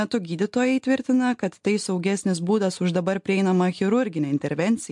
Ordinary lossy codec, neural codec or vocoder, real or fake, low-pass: MP3, 64 kbps; none; real; 10.8 kHz